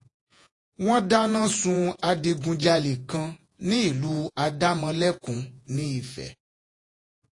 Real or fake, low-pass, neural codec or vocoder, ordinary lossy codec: fake; 10.8 kHz; vocoder, 48 kHz, 128 mel bands, Vocos; AAC, 48 kbps